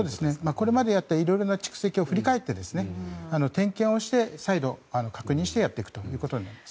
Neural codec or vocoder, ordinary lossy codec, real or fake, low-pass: none; none; real; none